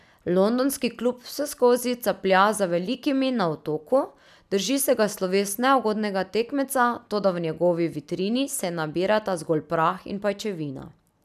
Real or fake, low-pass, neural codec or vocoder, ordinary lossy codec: real; 14.4 kHz; none; none